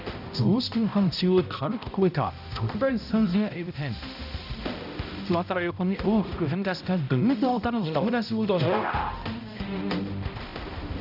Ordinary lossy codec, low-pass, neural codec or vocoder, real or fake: none; 5.4 kHz; codec, 16 kHz, 0.5 kbps, X-Codec, HuBERT features, trained on balanced general audio; fake